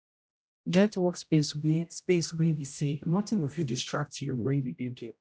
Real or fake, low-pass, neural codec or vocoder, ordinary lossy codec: fake; none; codec, 16 kHz, 0.5 kbps, X-Codec, HuBERT features, trained on general audio; none